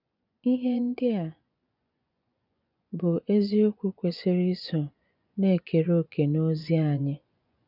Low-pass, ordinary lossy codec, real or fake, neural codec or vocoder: 5.4 kHz; none; fake; vocoder, 24 kHz, 100 mel bands, Vocos